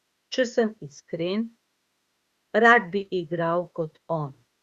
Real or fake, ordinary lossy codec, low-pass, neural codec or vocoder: fake; Opus, 64 kbps; 14.4 kHz; autoencoder, 48 kHz, 32 numbers a frame, DAC-VAE, trained on Japanese speech